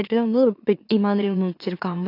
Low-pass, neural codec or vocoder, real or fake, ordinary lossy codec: 5.4 kHz; autoencoder, 44.1 kHz, a latent of 192 numbers a frame, MeloTTS; fake; AAC, 32 kbps